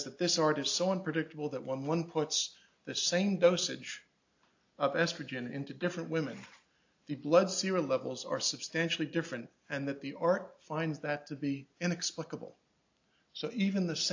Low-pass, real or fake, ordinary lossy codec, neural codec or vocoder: 7.2 kHz; real; AAC, 48 kbps; none